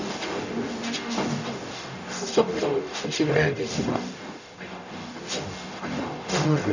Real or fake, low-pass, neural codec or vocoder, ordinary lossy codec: fake; 7.2 kHz; codec, 44.1 kHz, 0.9 kbps, DAC; none